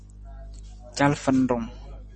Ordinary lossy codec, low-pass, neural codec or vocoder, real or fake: MP3, 32 kbps; 9.9 kHz; none; real